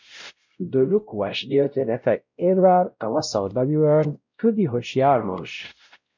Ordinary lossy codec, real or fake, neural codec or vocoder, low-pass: MP3, 64 kbps; fake; codec, 16 kHz, 0.5 kbps, X-Codec, WavLM features, trained on Multilingual LibriSpeech; 7.2 kHz